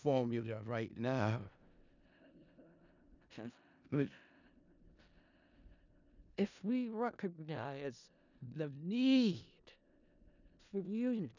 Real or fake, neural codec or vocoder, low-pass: fake; codec, 16 kHz in and 24 kHz out, 0.4 kbps, LongCat-Audio-Codec, four codebook decoder; 7.2 kHz